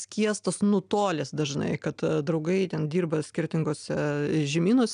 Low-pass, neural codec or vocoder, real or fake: 9.9 kHz; none; real